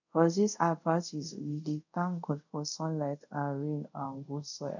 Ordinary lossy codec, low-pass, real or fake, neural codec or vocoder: none; 7.2 kHz; fake; codec, 24 kHz, 0.5 kbps, DualCodec